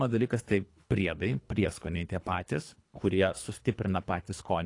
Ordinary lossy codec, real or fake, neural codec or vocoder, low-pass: AAC, 48 kbps; fake; codec, 24 kHz, 3 kbps, HILCodec; 10.8 kHz